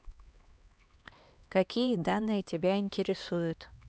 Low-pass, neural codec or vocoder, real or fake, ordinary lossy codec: none; codec, 16 kHz, 2 kbps, X-Codec, HuBERT features, trained on LibriSpeech; fake; none